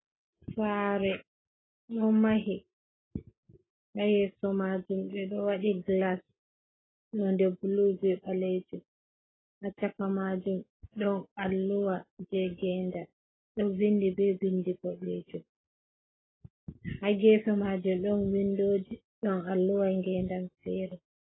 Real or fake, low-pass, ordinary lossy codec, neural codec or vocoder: real; 7.2 kHz; AAC, 16 kbps; none